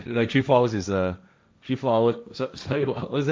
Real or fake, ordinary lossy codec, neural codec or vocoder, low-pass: fake; none; codec, 16 kHz, 1.1 kbps, Voila-Tokenizer; none